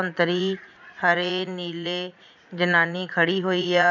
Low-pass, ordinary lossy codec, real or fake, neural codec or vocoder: 7.2 kHz; none; fake; vocoder, 44.1 kHz, 80 mel bands, Vocos